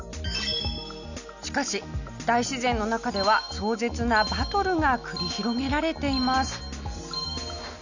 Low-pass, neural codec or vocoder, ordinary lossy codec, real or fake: 7.2 kHz; none; none; real